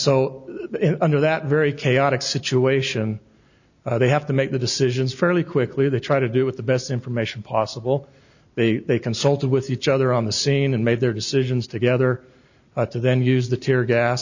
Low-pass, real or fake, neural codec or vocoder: 7.2 kHz; real; none